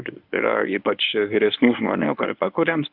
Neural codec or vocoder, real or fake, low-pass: codec, 24 kHz, 0.9 kbps, WavTokenizer, medium speech release version 1; fake; 5.4 kHz